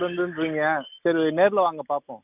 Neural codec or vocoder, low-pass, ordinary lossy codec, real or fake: none; 3.6 kHz; none; real